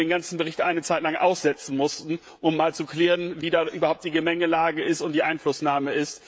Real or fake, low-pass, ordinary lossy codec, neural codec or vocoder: fake; none; none; codec, 16 kHz, 16 kbps, FreqCodec, smaller model